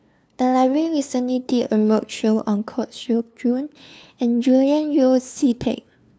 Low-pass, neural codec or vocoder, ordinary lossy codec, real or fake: none; codec, 16 kHz, 2 kbps, FunCodec, trained on LibriTTS, 25 frames a second; none; fake